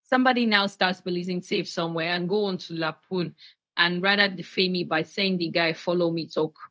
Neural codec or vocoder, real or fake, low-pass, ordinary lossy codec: codec, 16 kHz, 0.4 kbps, LongCat-Audio-Codec; fake; none; none